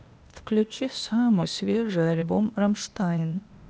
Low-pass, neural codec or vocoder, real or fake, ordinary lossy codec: none; codec, 16 kHz, 0.8 kbps, ZipCodec; fake; none